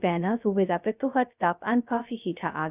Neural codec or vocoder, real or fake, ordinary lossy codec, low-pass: codec, 16 kHz, 0.2 kbps, FocalCodec; fake; none; 3.6 kHz